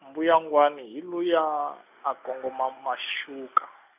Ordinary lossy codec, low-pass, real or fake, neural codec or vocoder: none; 3.6 kHz; real; none